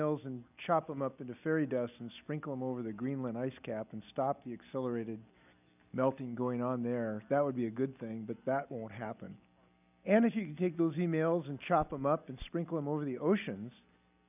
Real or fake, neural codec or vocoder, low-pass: real; none; 3.6 kHz